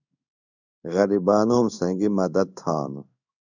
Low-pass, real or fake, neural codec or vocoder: 7.2 kHz; fake; codec, 16 kHz in and 24 kHz out, 1 kbps, XY-Tokenizer